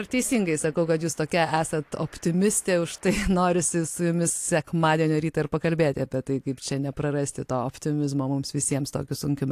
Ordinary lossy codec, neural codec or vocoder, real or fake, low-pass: AAC, 64 kbps; none; real; 14.4 kHz